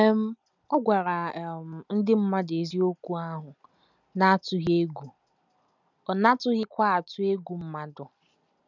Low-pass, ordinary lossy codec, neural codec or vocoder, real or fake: 7.2 kHz; none; none; real